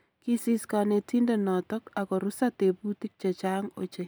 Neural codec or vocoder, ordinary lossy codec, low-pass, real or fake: none; none; none; real